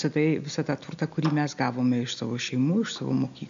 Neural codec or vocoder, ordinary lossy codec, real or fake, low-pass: none; MP3, 64 kbps; real; 7.2 kHz